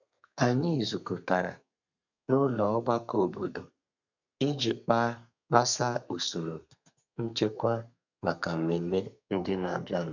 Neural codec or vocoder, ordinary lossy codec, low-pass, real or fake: codec, 32 kHz, 1.9 kbps, SNAC; none; 7.2 kHz; fake